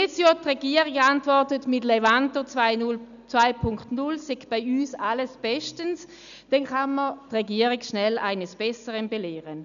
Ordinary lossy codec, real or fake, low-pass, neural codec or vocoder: none; real; 7.2 kHz; none